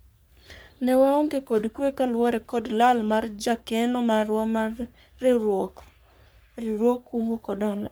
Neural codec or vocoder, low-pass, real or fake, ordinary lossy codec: codec, 44.1 kHz, 3.4 kbps, Pupu-Codec; none; fake; none